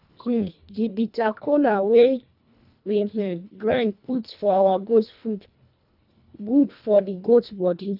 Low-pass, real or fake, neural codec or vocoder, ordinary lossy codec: 5.4 kHz; fake; codec, 24 kHz, 1.5 kbps, HILCodec; none